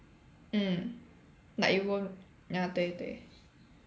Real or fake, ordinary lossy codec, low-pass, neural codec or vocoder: real; none; none; none